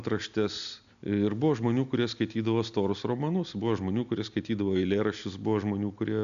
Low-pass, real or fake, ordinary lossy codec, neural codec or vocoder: 7.2 kHz; real; MP3, 96 kbps; none